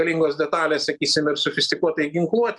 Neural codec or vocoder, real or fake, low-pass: none; real; 10.8 kHz